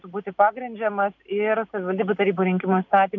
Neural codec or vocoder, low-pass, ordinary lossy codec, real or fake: none; 7.2 kHz; MP3, 64 kbps; real